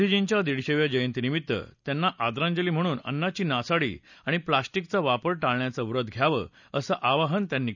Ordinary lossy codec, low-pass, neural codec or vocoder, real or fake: none; 7.2 kHz; none; real